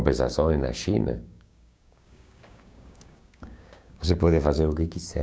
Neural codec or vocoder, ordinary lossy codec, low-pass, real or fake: codec, 16 kHz, 6 kbps, DAC; none; none; fake